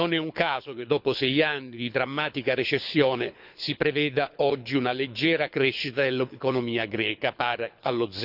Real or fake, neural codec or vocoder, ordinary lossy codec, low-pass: fake; codec, 24 kHz, 6 kbps, HILCodec; none; 5.4 kHz